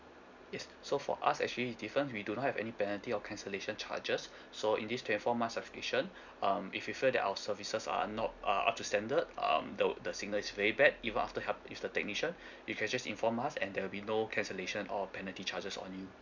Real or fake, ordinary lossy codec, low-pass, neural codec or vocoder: real; none; 7.2 kHz; none